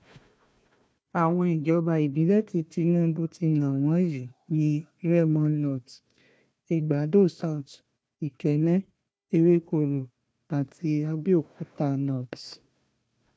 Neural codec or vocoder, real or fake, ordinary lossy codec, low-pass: codec, 16 kHz, 1 kbps, FunCodec, trained on Chinese and English, 50 frames a second; fake; none; none